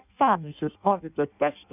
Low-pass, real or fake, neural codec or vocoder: 3.6 kHz; fake; codec, 16 kHz in and 24 kHz out, 0.6 kbps, FireRedTTS-2 codec